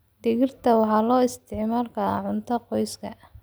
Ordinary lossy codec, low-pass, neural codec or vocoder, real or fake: none; none; none; real